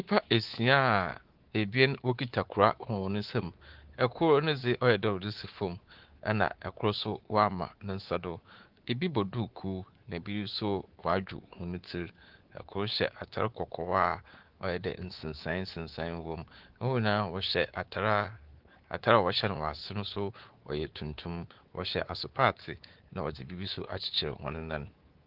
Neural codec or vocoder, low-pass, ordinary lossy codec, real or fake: codec, 24 kHz, 3.1 kbps, DualCodec; 5.4 kHz; Opus, 16 kbps; fake